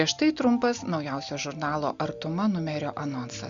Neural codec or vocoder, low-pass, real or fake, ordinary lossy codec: none; 7.2 kHz; real; Opus, 64 kbps